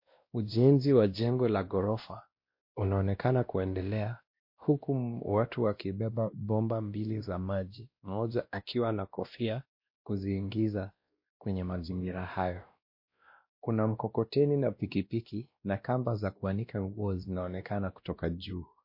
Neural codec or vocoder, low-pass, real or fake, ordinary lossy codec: codec, 16 kHz, 1 kbps, X-Codec, WavLM features, trained on Multilingual LibriSpeech; 5.4 kHz; fake; MP3, 32 kbps